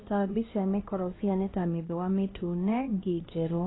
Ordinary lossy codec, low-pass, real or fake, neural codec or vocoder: AAC, 16 kbps; 7.2 kHz; fake; codec, 16 kHz, 1 kbps, X-Codec, HuBERT features, trained on LibriSpeech